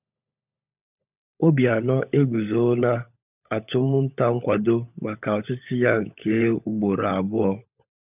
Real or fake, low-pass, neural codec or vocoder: fake; 3.6 kHz; codec, 16 kHz, 16 kbps, FunCodec, trained on LibriTTS, 50 frames a second